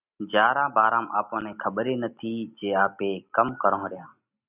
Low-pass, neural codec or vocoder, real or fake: 3.6 kHz; none; real